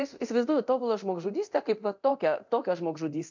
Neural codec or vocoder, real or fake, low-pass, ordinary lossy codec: codec, 24 kHz, 0.9 kbps, DualCodec; fake; 7.2 kHz; MP3, 64 kbps